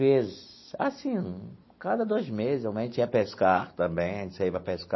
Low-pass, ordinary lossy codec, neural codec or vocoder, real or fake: 7.2 kHz; MP3, 24 kbps; none; real